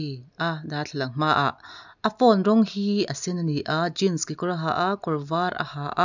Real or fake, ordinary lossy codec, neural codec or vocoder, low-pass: real; none; none; 7.2 kHz